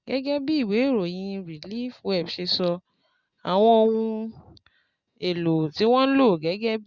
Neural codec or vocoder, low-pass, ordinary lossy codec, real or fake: none; 7.2 kHz; Opus, 64 kbps; real